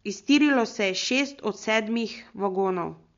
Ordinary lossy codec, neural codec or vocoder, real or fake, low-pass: MP3, 48 kbps; none; real; 7.2 kHz